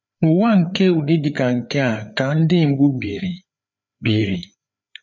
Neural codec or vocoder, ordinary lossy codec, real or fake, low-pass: codec, 16 kHz, 4 kbps, FreqCodec, larger model; none; fake; 7.2 kHz